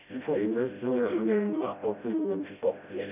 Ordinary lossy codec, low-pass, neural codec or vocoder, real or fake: none; 3.6 kHz; codec, 16 kHz, 0.5 kbps, FreqCodec, smaller model; fake